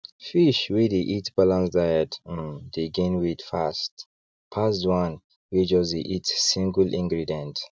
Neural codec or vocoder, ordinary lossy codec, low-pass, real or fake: none; none; none; real